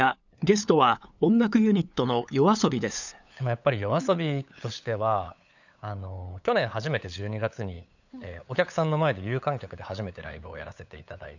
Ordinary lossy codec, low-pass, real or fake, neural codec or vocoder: none; 7.2 kHz; fake; codec, 16 kHz, 8 kbps, FunCodec, trained on LibriTTS, 25 frames a second